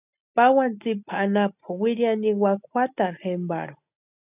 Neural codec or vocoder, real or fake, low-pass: none; real; 3.6 kHz